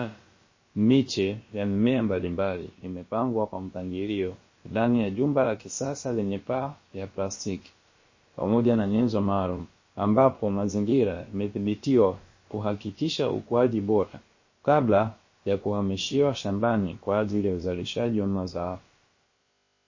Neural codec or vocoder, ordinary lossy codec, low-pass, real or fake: codec, 16 kHz, about 1 kbps, DyCAST, with the encoder's durations; MP3, 32 kbps; 7.2 kHz; fake